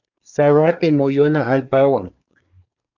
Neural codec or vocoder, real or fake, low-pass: codec, 24 kHz, 1 kbps, SNAC; fake; 7.2 kHz